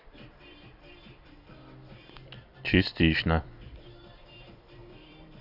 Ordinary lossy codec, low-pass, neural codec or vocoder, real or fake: none; 5.4 kHz; vocoder, 44.1 kHz, 128 mel bands every 256 samples, BigVGAN v2; fake